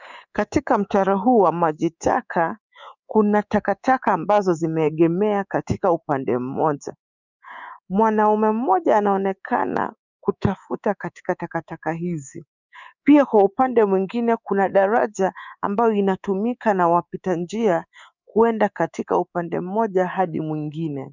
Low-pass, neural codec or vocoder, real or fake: 7.2 kHz; codec, 24 kHz, 3.1 kbps, DualCodec; fake